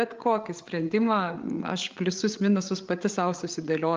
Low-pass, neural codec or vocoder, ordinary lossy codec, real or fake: 7.2 kHz; codec, 16 kHz, 8 kbps, FreqCodec, larger model; Opus, 24 kbps; fake